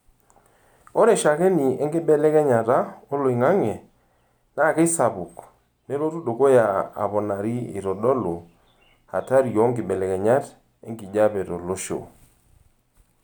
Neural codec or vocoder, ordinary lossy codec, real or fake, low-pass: none; none; real; none